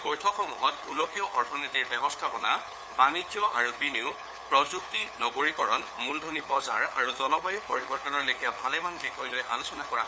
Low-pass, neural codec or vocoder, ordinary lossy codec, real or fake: none; codec, 16 kHz, 4 kbps, FunCodec, trained on LibriTTS, 50 frames a second; none; fake